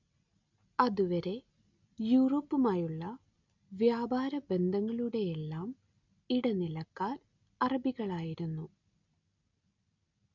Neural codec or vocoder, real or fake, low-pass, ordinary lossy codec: none; real; 7.2 kHz; none